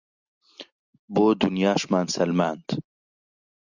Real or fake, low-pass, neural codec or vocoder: real; 7.2 kHz; none